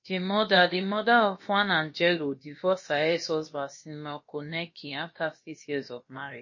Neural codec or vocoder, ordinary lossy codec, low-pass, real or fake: codec, 16 kHz, about 1 kbps, DyCAST, with the encoder's durations; MP3, 32 kbps; 7.2 kHz; fake